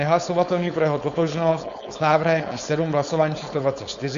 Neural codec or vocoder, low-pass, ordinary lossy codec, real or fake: codec, 16 kHz, 4.8 kbps, FACodec; 7.2 kHz; Opus, 64 kbps; fake